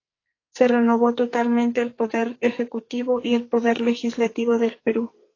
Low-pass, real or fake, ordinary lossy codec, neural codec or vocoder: 7.2 kHz; fake; AAC, 32 kbps; codec, 44.1 kHz, 2.6 kbps, SNAC